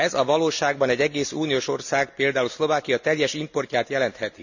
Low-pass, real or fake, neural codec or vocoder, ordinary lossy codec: 7.2 kHz; real; none; none